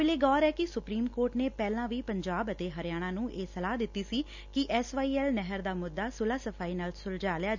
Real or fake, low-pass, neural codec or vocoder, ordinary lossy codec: real; 7.2 kHz; none; none